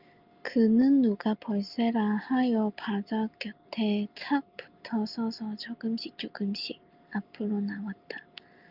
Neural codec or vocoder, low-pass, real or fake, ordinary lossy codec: none; 5.4 kHz; real; Opus, 24 kbps